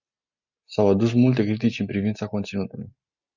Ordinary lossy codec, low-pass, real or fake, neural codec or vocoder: Opus, 64 kbps; 7.2 kHz; real; none